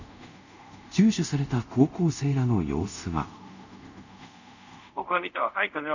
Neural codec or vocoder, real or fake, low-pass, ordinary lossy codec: codec, 24 kHz, 0.5 kbps, DualCodec; fake; 7.2 kHz; none